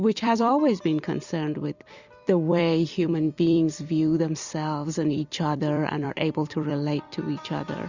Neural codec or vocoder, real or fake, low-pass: vocoder, 44.1 kHz, 128 mel bands every 256 samples, BigVGAN v2; fake; 7.2 kHz